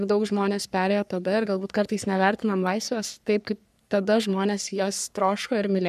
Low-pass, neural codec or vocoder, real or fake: 14.4 kHz; codec, 44.1 kHz, 3.4 kbps, Pupu-Codec; fake